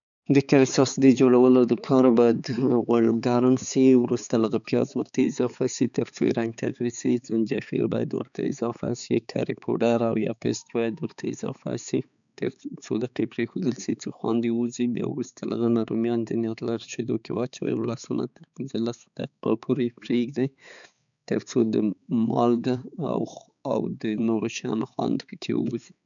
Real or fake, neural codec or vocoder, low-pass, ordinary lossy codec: fake; codec, 16 kHz, 4 kbps, X-Codec, HuBERT features, trained on balanced general audio; 7.2 kHz; none